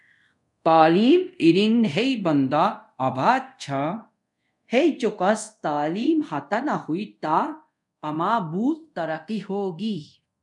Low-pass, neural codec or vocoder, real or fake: 10.8 kHz; codec, 24 kHz, 0.5 kbps, DualCodec; fake